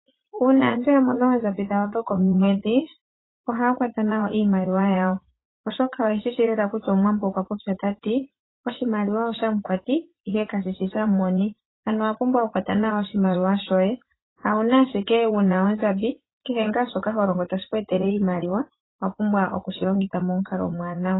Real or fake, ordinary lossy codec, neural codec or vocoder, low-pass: fake; AAC, 16 kbps; vocoder, 44.1 kHz, 80 mel bands, Vocos; 7.2 kHz